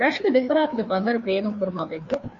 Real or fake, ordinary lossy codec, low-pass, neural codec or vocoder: fake; MP3, 48 kbps; 7.2 kHz; codec, 16 kHz, 2 kbps, FreqCodec, larger model